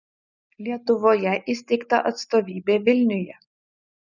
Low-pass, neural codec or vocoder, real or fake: 7.2 kHz; none; real